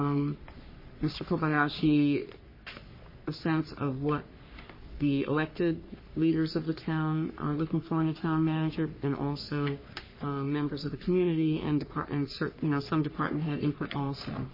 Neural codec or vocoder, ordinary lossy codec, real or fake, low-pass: codec, 44.1 kHz, 3.4 kbps, Pupu-Codec; MP3, 24 kbps; fake; 5.4 kHz